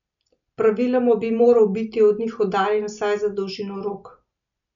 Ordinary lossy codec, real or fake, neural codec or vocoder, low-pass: none; real; none; 7.2 kHz